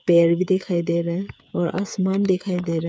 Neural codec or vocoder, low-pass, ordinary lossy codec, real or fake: codec, 16 kHz, 8 kbps, FreqCodec, smaller model; none; none; fake